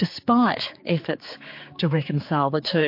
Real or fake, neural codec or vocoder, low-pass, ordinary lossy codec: fake; codec, 16 kHz, 4 kbps, X-Codec, HuBERT features, trained on general audio; 5.4 kHz; MP3, 32 kbps